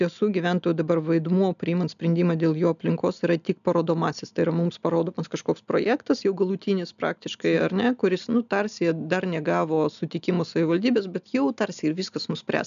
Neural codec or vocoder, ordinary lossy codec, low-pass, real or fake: none; MP3, 96 kbps; 7.2 kHz; real